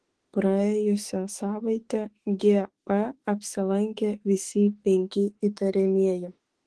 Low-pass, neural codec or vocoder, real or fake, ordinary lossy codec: 10.8 kHz; autoencoder, 48 kHz, 32 numbers a frame, DAC-VAE, trained on Japanese speech; fake; Opus, 16 kbps